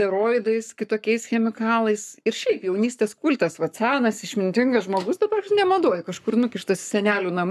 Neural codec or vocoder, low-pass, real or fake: codec, 44.1 kHz, 7.8 kbps, Pupu-Codec; 14.4 kHz; fake